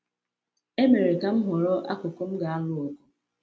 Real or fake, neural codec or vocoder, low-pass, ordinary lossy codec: real; none; none; none